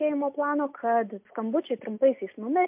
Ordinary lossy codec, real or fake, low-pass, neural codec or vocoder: MP3, 32 kbps; real; 3.6 kHz; none